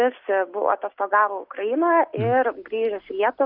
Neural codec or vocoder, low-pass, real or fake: none; 5.4 kHz; real